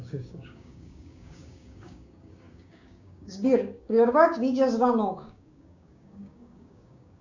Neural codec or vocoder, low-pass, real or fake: codec, 16 kHz, 6 kbps, DAC; 7.2 kHz; fake